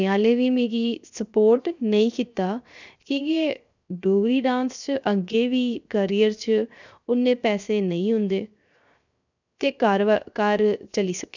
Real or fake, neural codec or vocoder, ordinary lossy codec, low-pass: fake; codec, 16 kHz, 0.7 kbps, FocalCodec; none; 7.2 kHz